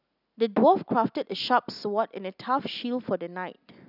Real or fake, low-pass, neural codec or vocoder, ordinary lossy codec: real; 5.4 kHz; none; none